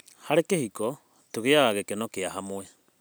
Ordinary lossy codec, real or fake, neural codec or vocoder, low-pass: none; real; none; none